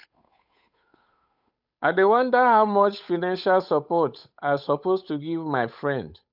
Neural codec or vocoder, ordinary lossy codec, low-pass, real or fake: codec, 16 kHz, 8 kbps, FunCodec, trained on Chinese and English, 25 frames a second; none; 5.4 kHz; fake